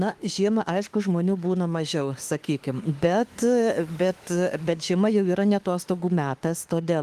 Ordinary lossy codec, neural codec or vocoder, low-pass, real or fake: Opus, 24 kbps; autoencoder, 48 kHz, 32 numbers a frame, DAC-VAE, trained on Japanese speech; 14.4 kHz; fake